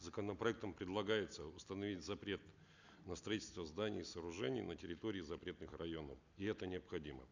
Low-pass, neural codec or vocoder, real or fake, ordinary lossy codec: 7.2 kHz; none; real; none